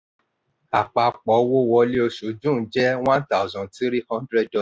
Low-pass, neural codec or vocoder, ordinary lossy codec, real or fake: none; none; none; real